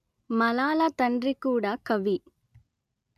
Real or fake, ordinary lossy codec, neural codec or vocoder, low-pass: real; none; none; 14.4 kHz